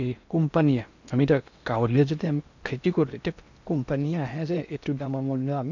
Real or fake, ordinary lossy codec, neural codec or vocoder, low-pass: fake; none; codec, 16 kHz in and 24 kHz out, 0.8 kbps, FocalCodec, streaming, 65536 codes; 7.2 kHz